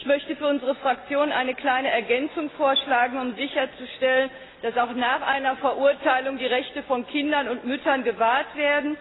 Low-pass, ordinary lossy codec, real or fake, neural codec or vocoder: 7.2 kHz; AAC, 16 kbps; real; none